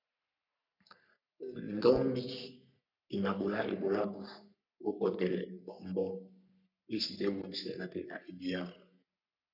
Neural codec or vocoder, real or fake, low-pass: codec, 44.1 kHz, 3.4 kbps, Pupu-Codec; fake; 5.4 kHz